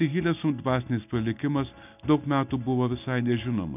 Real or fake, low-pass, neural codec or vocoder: real; 3.6 kHz; none